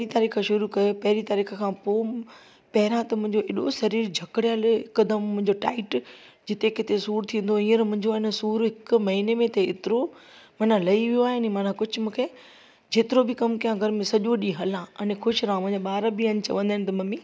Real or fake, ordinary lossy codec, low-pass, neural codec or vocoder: real; none; none; none